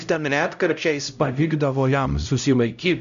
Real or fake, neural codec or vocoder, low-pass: fake; codec, 16 kHz, 0.5 kbps, X-Codec, HuBERT features, trained on LibriSpeech; 7.2 kHz